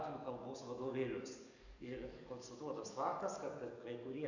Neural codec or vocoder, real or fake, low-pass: codec, 44.1 kHz, 7.8 kbps, DAC; fake; 7.2 kHz